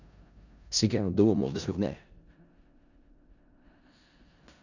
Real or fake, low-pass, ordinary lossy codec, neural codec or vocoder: fake; 7.2 kHz; none; codec, 16 kHz in and 24 kHz out, 0.4 kbps, LongCat-Audio-Codec, four codebook decoder